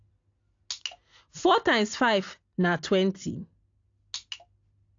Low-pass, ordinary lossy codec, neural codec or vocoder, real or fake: 7.2 kHz; AAC, 48 kbps; none; real